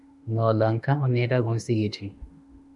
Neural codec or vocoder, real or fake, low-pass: autoencoder, 48 kHz, 32 numbers a frame, DAC-VAE, trained on Japanese speech; fake; 10.8 kHz